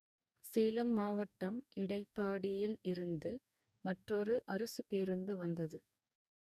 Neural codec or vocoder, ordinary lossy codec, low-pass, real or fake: codec, 44.1 kHz, 2.6 kbps, DAC; none; 14.4 kHz; fake